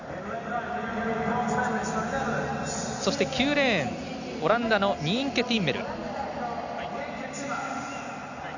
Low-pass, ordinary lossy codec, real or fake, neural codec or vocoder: 7.2 kHz; none; real; none